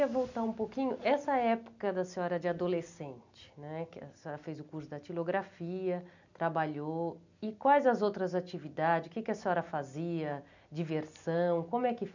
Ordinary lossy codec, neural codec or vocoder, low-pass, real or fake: none; none; 7.2 kHz; real